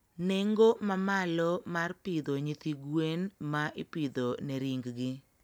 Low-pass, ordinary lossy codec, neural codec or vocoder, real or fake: none; none; none; real